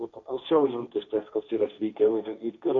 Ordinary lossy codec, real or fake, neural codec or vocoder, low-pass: AAC, 64 kbps; fake; codec, 16 kHz, 1.1 kbps, Voila-Tokenizer; 7.2 kHz